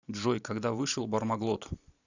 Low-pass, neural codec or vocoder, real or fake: 7.2 kHz; vocoder, 44.1 kHz, 128 mel bands every 512 samples, BigVGAN v2; fake